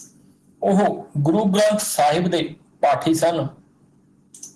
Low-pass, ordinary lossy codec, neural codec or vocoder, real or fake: 10.8 kHz; Opus, 16 kbps; none; real